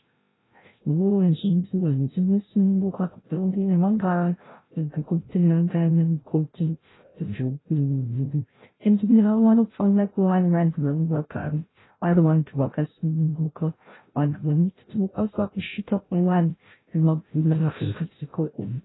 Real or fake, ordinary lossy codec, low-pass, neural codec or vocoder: fake; AAC, 16 kbps; 7.2 kHz; codec, 16 kHz, 0.5 kbps, FreqCodec, larger model